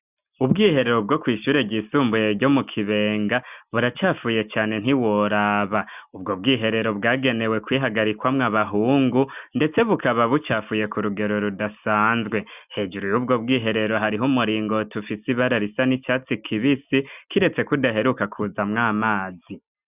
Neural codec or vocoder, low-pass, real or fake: none; 3.6 kHz; real